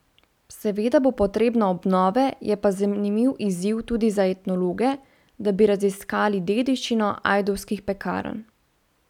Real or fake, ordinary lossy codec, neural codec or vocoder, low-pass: real; none; none; 19.8 kHz